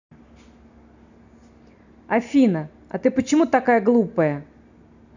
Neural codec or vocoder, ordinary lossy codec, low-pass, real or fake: none; none; 7.2 kHz; real